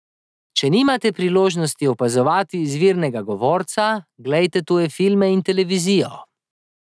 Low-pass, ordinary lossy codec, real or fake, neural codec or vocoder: none; none; real; none